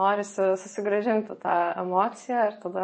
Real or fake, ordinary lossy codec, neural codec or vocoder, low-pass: fake; MP3, 32 kbps; vocoder, 24 kHz, 100 mel bands, Vocos; 9.9 kHz